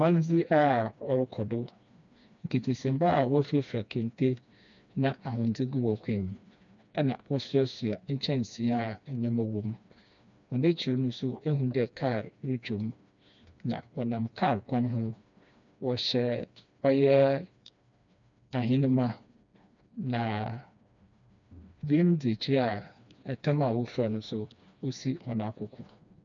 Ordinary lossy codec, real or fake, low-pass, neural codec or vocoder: MP3, 96 kbps; fake; 7.2 kHz; codec, 16 kHz, 2 kbps, FreqCodec, smaller model